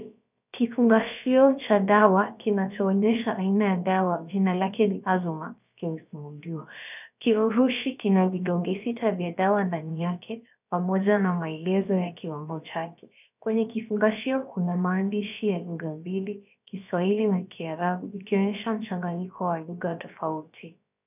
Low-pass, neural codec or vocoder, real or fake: 3.6 kHz; codec, 16 kHz, about 1 kbps, DyCAST, with the encoder's durations; fake